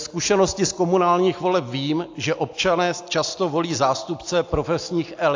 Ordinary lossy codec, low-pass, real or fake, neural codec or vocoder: AAC, 48 kbps; 7.2 kHz; real; none